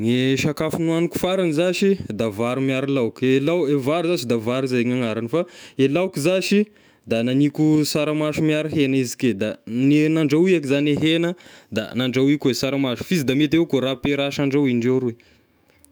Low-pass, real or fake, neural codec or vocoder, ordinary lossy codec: none; fake; autoencoder, 48 kHz, 128 numbers a frame, DAC-VAE, trained on Japanese speech; none